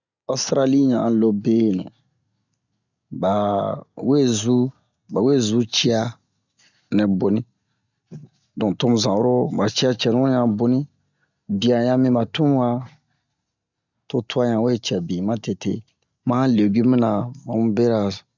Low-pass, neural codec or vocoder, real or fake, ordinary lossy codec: 7.2 kHz; none; real; none